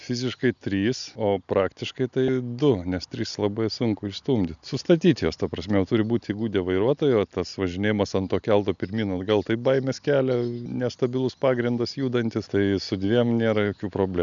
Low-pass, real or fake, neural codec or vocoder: 7.2 kHz; real; none